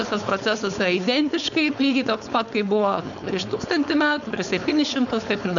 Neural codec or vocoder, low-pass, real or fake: codec, 16 kHz, 4.8 kbps, FACodec; 7.2 kHz; fake